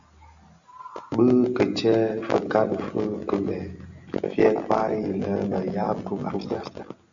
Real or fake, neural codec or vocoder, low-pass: real; none; 7.2 kHz